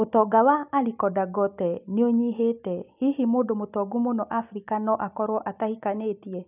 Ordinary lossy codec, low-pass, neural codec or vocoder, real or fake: none; 3.6 kHz; none; real